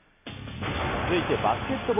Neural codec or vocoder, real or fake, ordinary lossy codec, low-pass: none; real; none; 3.6 kHz